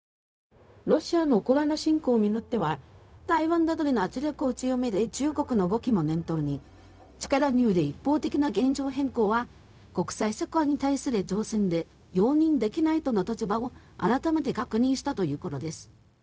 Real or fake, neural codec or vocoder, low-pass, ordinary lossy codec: fake; codec, 16 kHz, 0.4 kbps, LongCat-Audio-Codec; none; none